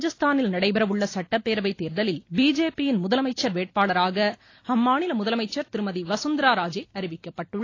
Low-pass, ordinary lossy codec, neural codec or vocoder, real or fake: 7.2 kHz; AAC, 32 kbps; none; real